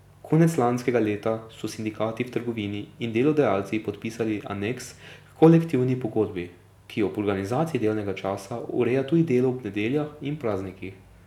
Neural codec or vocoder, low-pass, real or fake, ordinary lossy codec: none; 19.8 kHz; real; none